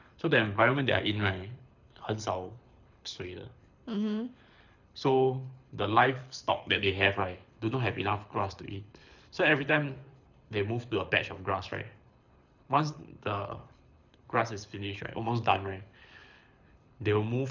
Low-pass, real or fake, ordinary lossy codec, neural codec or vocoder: 7.2 kHz; fake; none; codec, 24 kHz, 6 kbps, HILCodec